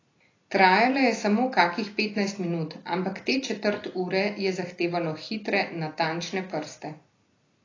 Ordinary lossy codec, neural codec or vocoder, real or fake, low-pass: AAC, 32 kbps; none; real; 7.2 kHz